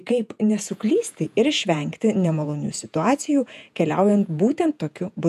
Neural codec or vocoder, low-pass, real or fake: vocoder, 48 kHz, 128 mel bands, Vocos; 14.4 kHz; fake